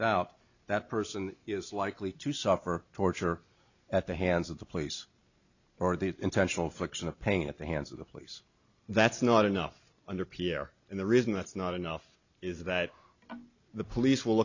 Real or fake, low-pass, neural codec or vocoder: real; 7.2 kHz; none